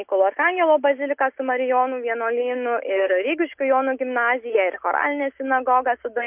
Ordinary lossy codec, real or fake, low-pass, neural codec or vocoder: MP3, 32 kbps; real; 3.6 kHz; none